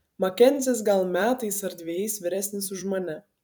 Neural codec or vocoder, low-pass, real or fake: vocoder, 44.1 kHz, 128 mel bands every 256 samples, BigVGAN v2; 19.8 kHz; fake